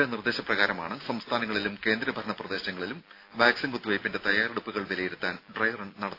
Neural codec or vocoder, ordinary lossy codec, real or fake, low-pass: none; AAC, 32 kbps; real; 5.4 kHz